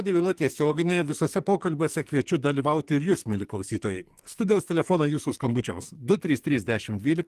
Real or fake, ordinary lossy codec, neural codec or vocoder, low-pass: fake; Opus, 24 kbps; codec, 44.1 kHz, 2.6 kbps, SNAC; 14.4 kHz